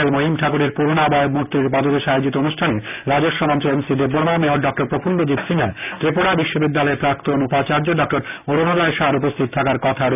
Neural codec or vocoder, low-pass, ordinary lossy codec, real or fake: none; 3.6 kHz; none; real